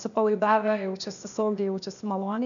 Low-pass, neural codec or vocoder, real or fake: 7.2 kHz; codec, 16 kHz, 0.8 kbps, ZipCodec; fake